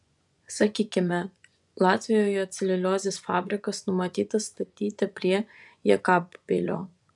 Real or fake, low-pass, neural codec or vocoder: fake; 10.8 kHz; vocoder, 24 kHz, 100 mel bands, Vocos